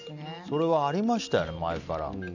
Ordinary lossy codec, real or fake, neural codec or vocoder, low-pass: none; real; none; 7.2 kHz